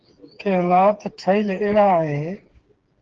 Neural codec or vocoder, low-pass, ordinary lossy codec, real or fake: codec, 16 kHz, 4 kbps, FreqCodec, smaller model; 7.2 kHz; Opus, 16 kbps; fake